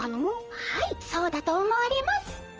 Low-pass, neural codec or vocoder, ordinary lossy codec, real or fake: 7.2 kHz; vocoder, 44.1 kHz, 128 mel bands, Pupu-Vocoder; Opus, 24 kbps; fake